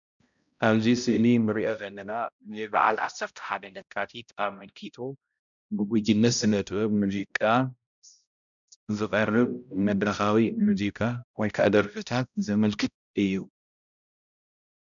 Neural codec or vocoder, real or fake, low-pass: codec, 16 kHz, 0.5 kbps, X-Codec, HuBERT features, trained on balanced general audio; fake; 7.2 kHz